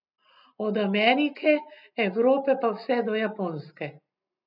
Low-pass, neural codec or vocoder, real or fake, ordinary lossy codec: 5.4 kHz; none; real; none